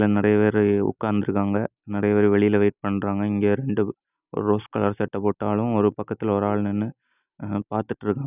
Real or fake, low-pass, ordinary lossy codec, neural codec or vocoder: real; 3.6 kHz; none; none